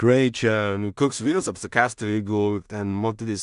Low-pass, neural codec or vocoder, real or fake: 10.8 kHz; codec, 16 kHz in and 24 kHz out, 0.4 kbps, LongCat-Audio-Codec, two codebook decoder; fake